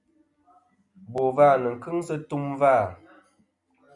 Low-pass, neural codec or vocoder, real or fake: 10.8 kHz; none; real